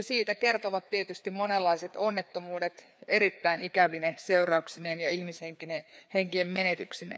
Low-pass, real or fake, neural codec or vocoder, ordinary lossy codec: none; fake; codec, 16 kHz, 2 kbps, FreqCodec, larger model; none